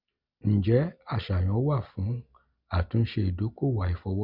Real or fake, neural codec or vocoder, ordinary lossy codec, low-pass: real; none; none; 5.4 kHz